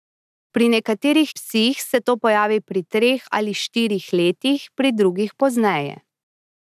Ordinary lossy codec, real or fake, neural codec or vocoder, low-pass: none; real; none; 14.4 kHz